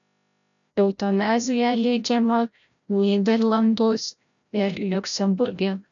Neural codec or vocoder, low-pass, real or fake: codec, 16 kHz, 0.5 kbps, FreqCodec, larger model; 7.2 kHz; fake